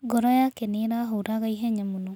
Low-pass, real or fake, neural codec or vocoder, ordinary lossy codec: 19.8 kHz; real; none; none